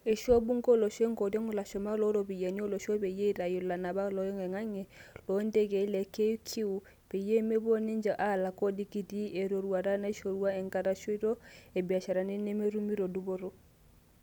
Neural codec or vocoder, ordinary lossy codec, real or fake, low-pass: none; none; real; 19.8 kHz